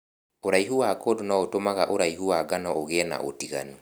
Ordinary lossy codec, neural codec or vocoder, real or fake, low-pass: none; none; real; none